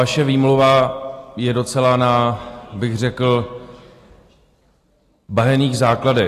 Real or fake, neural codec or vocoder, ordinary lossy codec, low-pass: real; none; AAC, 48 kbps; 14.4 kHz